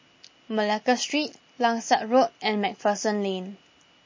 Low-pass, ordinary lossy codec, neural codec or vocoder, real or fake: 7.2 kHz; MP3, 32 kbps; none; real